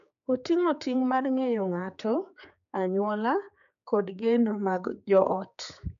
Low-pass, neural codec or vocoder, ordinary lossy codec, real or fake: 7.2 kHz; codec, 16 kHz, 4 kbps, X-Codec, HuBERT features, trained on general audio; AAC, 96 kbps; fake